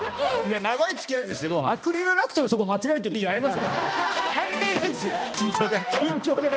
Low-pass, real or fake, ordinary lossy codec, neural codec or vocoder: none; fake; none; codec, 16 kHz, 1 kbps, X-Codec, HuBERT features, trained on balanced general audio